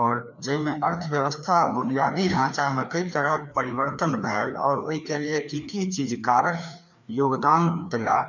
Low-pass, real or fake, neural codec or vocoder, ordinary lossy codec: 7.2 kHz; fake; codec, 16 kHz, 2 kbps, FreqCodec, larger model; none